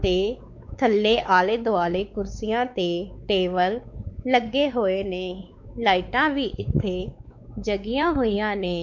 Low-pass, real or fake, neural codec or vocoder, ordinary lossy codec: 7.2 kHz; fake; codec, 16 kHz, 4 kbps, X-Codec, WavLM features, trained on Multilingual LibriSpeech; MP3, 48 kbps